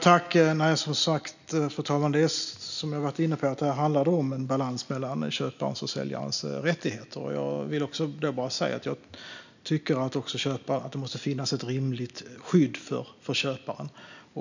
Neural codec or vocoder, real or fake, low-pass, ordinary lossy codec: none; real; 7.2 kHz; none